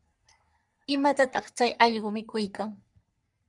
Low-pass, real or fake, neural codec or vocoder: 10.8 kHz; fake; codec, 44.1 kHz, 3.4 kbps, Pupu-Codec